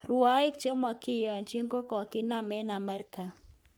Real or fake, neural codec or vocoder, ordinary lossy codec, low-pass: fake; codec, 44.1 kHz, 3.4 kbps, Pupu-Codec; none; none